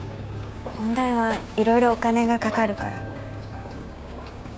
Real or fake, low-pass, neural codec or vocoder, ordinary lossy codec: fake; none; codec, 16 kHz, 6 kbps, DAC; none